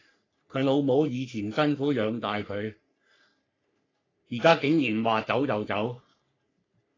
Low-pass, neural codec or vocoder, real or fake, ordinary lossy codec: 7.2 kHz; codec, 44.1 kHz, 3.4 kbps, Pupu-Codec; fake; AAC, 32 kbps